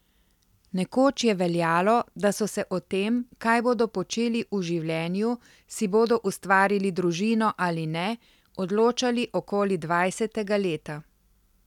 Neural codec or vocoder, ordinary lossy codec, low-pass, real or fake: none; none; 19.8 kHz; real